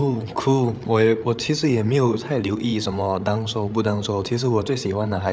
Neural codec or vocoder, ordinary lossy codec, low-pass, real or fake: codec, 16 kHz, 8 kbps, FreqCodec, larger model; none; none; fake